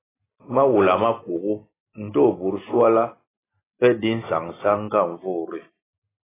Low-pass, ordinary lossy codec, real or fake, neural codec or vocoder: 3.6 kHz; AAC, 16 kbps; real; none